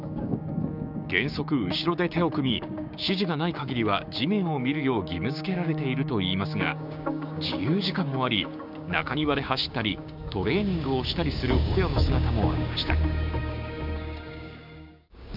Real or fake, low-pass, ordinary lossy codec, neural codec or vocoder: fake; 5.4 kHz; none; codec, 16 kHz, 6 kbps, DAC